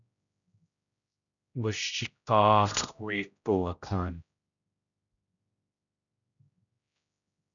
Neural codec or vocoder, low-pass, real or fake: codec, 16 kHz, 0.5 kbps, X-Codec, HuBERT features, trained on general audio; 7.2 kHz; fake